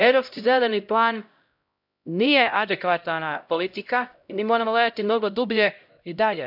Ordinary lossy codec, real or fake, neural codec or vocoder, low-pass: none; fake; codec, 16 kHz, 0.5 kbps, X-Codec, HuBERT features, trained on LibriSpeech; 5.4 kHz